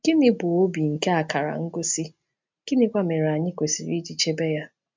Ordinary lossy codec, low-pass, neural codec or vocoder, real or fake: MP3, 64 kbps; 7.2 kHz; none; real